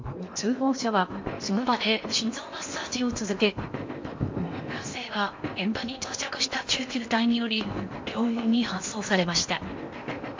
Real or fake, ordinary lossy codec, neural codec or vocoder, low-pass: fake; MP3, 48 kbps; codec, 16 kHz in and 24 kHz out, 0.8 kbps, FocalCodec, streaming, 65536 codes; 7.2 kHz